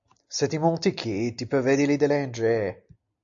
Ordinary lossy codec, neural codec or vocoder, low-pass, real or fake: AAC, 64 kbps; none; 7.2 kHz; real